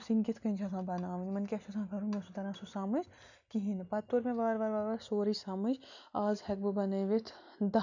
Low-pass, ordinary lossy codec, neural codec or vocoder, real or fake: 7.2 kHz; none; none; real